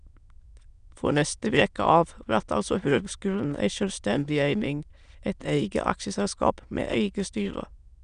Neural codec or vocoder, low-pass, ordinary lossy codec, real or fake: autoencoder, 22.05 kHz, a latent of 192 numbers a frame, VITS, trained on many speakers; 9.9 kHz; none; fake